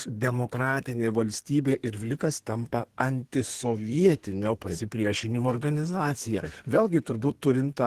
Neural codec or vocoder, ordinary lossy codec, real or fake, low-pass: codec, 32 kHz, 1.9 kbps, SNAC; Opus, 16 kbps; fake; 14.4 kHz